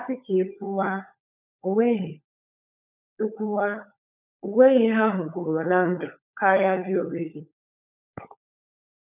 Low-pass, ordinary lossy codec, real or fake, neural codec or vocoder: 3.6 kHz; none; fake; codec, 16 kHz, 16 kbps, FunCodec, trained on LibriTTS, 50 frames a second